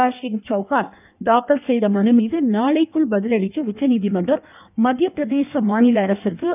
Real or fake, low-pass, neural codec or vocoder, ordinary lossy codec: fake; 3.6 kHz; codec, 16 kHz in and 24 kHz out, 1.1 kbps, FireRedTTS-2 codec; none